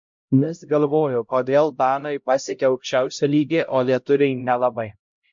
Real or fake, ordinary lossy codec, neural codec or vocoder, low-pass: fake; MP3, 48 kbps; codec, 16 kHz, 0.5 kbps, X-Codec, HuBERT features, trained on LibriSpeech; 7.2 kHz